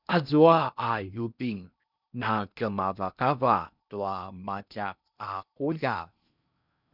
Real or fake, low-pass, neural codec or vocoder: fake; 5.4 kHz; codec, 16 kHz in and 24 kHz out, 0.8 kbps, FocalCodec, streaming, 65536 codes